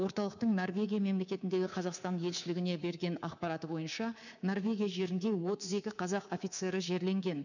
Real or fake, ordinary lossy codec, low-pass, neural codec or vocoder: fake; none; 7.2 kHz; codec, 24 kHz, 3.1 kbps, DualCodec